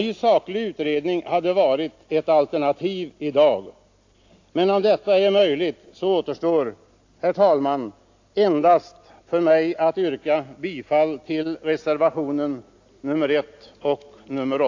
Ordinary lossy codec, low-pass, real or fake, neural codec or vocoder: AAC, 48 kbps; 7.2 kHz; real; none